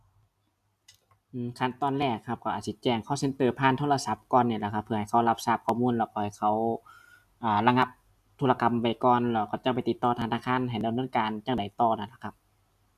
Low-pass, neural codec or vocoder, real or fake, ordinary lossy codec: 14.4 kHz; none; real; none